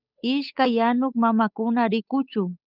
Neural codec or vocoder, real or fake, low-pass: codec, 16 kHz, 8 kbps, FunCodec, trained on Chinese and English, 25 frames a second; fake; 5.4 kHz